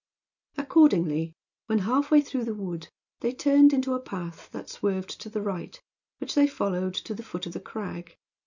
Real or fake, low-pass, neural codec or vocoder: real; 7.2 kHz; none